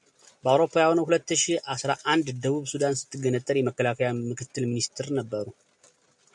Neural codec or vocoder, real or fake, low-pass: none; real; 10.8 kHz